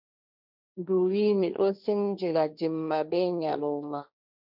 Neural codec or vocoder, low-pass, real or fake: codec, 16 kHz, 1.1 kbps, Voila-Tokenizer; 5.4 kHz; fake